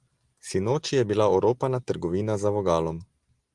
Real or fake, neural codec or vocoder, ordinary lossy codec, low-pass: real; none; Opus, 24 kbps; 10.8 kHz